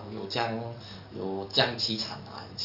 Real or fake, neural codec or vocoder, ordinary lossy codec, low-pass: fake; codec, 44.1 kHz, 7.8 kbps, DAC; none; 5.4 kHz